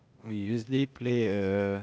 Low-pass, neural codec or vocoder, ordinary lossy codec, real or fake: none; codec, 16 kHz, 0.8 kbps, ZipCodec; none; fake